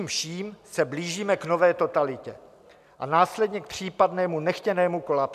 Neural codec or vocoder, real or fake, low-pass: none; real; 14.4 kHz